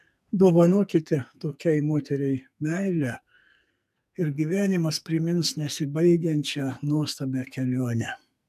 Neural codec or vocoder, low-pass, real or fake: codec, 44.1 kHz, 2.6 kbps, SNAC; 14.4 kHz; fake